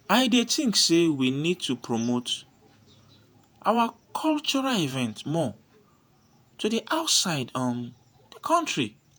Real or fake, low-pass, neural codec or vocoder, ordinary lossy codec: fake; none; vocoder, 48 kHz, 128 mel bands, Vocos; none